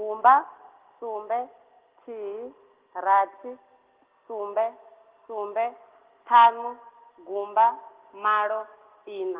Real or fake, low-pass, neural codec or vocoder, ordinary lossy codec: real; 3.6 kHz; none; Opus, 16 kbps